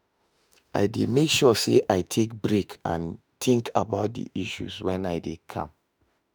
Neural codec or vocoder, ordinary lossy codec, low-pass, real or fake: autoencoder, 48 kHz, 32 numbers a frame, DAC-VAE, trained on Japanese speech; none; none; fake